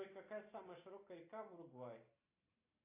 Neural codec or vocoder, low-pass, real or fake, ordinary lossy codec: none; 3.6 kHz; real; AAC, 24 kbps